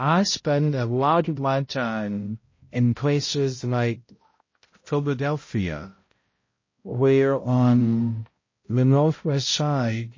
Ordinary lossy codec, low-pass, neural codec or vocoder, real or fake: MP3, 32 kbps; 7.2 kHz; codec, 16 kHz, 0.5 kbps, X-Codec, HuBERT features, trained on balanced general audio; fake